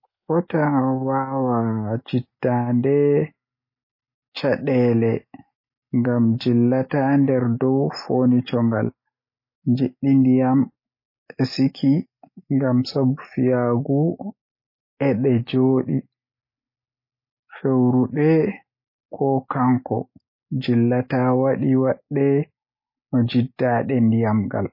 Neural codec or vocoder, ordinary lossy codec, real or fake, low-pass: none; MP3, 24 kbps; real; 5.4 kHz